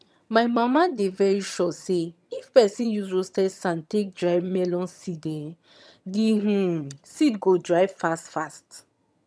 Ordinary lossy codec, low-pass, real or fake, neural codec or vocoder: none; none; fake; vocoder, 22.05 kHz, 80 mel bands, HiFi-GAN